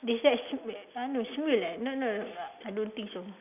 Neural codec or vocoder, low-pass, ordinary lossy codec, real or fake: none; 3.6 kHz; none; real